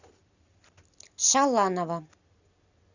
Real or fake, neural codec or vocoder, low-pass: real; none; 7.2 kHz